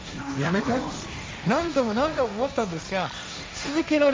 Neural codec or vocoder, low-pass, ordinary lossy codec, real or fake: codec, 16 kHz, 1.1 kbps, Voila-Tokenizer; none; none; fake